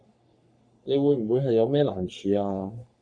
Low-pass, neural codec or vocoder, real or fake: 9.9 kHz; codec, 44.1 kHz, 3.4 kbps, Pupu-Codec; fake